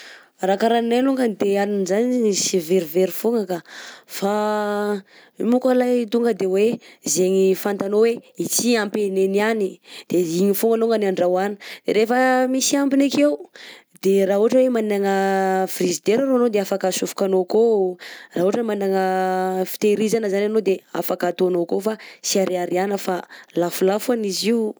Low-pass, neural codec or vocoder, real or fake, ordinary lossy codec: none; none; real; none